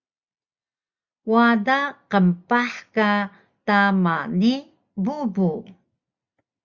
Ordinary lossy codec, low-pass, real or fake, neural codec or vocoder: Opus, 64 kbps; 7.2 kHz; real; none